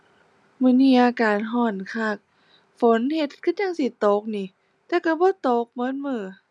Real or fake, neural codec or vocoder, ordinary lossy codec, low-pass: real; none; none; none